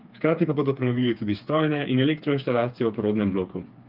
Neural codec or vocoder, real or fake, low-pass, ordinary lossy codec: codec, 16 kHz, 4 kbps, FreqCodec, smaller model; fake; 5.4 kHz; Opus, 24 kbps